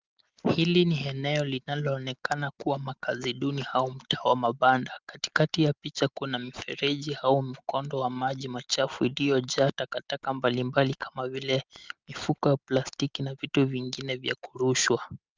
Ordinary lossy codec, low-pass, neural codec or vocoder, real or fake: Opus, 24 kbps; 7.2 kHz; none; real